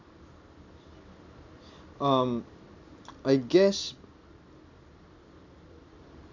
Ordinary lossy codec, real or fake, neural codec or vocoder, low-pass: none; real; none; 7.2 kHz